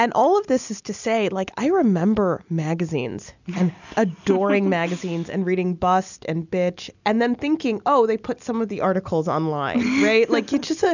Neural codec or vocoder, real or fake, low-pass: none; real; 7.2 kHz